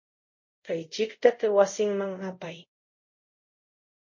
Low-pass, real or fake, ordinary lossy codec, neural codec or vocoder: 7.2 kHz; fake; MP3, 48 kbps; codec, 24 kHz, 0.5 kbps, DualCodec